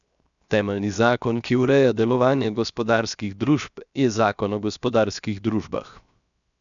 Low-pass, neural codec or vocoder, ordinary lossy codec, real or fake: 7.2 kHz; codec, 16 kHz, 0.7 kbps, FocalCodec; none; fake